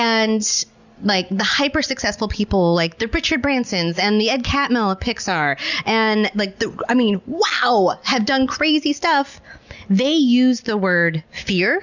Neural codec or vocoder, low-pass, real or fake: none; 7.2 kHz; real